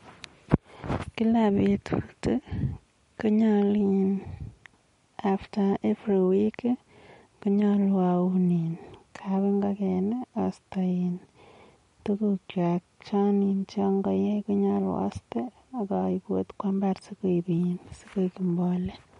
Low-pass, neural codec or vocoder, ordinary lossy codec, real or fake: 10.8 kHz; none; MP3, 48 kbps; real